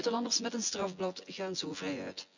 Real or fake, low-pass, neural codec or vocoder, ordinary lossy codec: fake; 7.2 kHz; vocoder, 24 kHz, 100 mel bands, Vocos; none